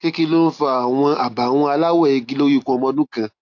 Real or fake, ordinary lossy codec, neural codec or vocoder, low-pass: real; AAC, 48 kbps; none; 7.2 kHz